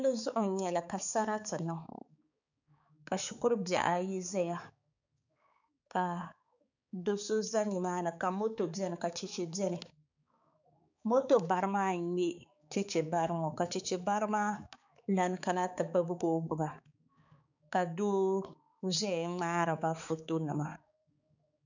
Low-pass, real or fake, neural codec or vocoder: 7.2 kHz; fake; codec, 16 kHz, 4 kbps, X-Codec, HuBERT features, trained on balanced general audio